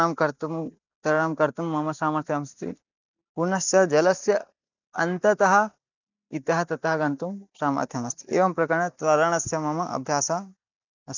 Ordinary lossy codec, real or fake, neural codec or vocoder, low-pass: none; real; none; 7.2 kHz